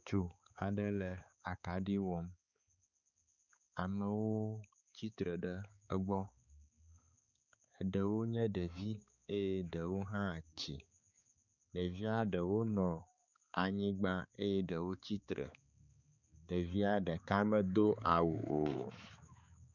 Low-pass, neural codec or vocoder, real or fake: 7.2 kHz; codec, 16 kHz, 4 kbps, X-Codec, HuBERT features, trained on balanced general audio; fake